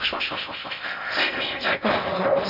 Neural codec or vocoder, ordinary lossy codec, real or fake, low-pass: codec, 16 kHz in and 24 kHz out, 0.6 kbps, FocalCodec, streaming, 4096 codes; none; fake; 5.4 kHz